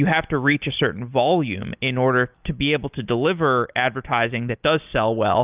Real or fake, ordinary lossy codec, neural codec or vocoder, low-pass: real; Opus, 24 kbps; none; 3.6 kHz